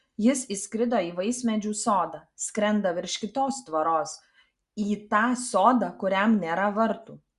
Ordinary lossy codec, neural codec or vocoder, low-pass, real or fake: Opus, 64 kbps; none; 10.8 kHz; real